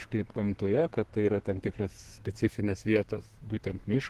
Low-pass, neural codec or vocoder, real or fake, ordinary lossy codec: 14.4 kHz; codec, 32 kHz, 1.9 kbps, SNAC; fake; Opus, 16 kbps